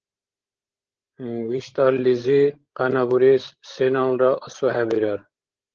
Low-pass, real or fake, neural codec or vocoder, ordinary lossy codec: 7.2 kHz; fake; codec, 16 kHz, 16 kbps, FreqCodec, larger model; Opus, 16 kbps